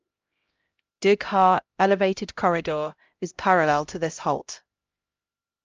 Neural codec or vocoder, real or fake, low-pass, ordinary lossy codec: codec, 16 kHz, 0.5 kbps, X-Codec, HuBERT features, trained on LibriSpeech; fake; 7.2 kHz; Opus, 24 kbps